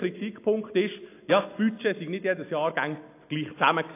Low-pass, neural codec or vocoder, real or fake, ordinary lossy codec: 3.6 kHz; none; real; AAC, 24 kbps